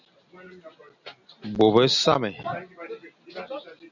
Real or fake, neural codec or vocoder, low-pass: real; none; 7.2 kHz